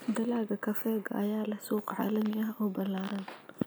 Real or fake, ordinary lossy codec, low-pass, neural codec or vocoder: real; none; none; none